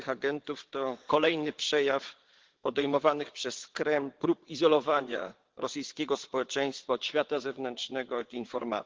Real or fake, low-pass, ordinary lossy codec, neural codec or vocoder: fake; 7.2 kHz; Opus, 16 kbps; vocoder, 22.05 kHz, 80 mel bands, WaveNeXt